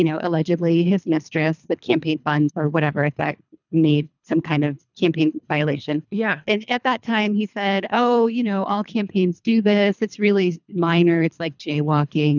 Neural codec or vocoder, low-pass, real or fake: codec, 24 kHz, 3 kbps, HILCodec; 7.2 kHz; fake